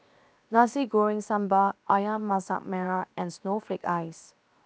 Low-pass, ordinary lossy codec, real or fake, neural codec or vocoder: none; none; fake; codec, 16 kHz, 0.7 kbps, FocalCodec